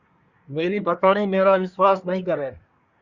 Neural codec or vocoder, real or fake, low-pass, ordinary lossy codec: codec, 24 kHz, 1 kbps, SNAC; fake; 7.2 kHz; Opus, 64 kbps